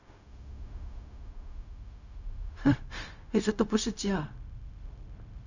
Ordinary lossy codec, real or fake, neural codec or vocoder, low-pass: none; fake; codec, 16 kHz, 0.4 kbps, LongCat-Audio-Codec; 7.2 kHz